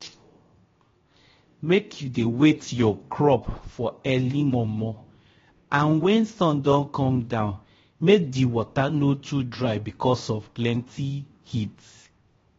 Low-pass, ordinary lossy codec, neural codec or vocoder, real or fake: 7.2 kHz; AAC, 24 kbps; codec, 16 kHz, 0.7 kbps, FocalCodec; fake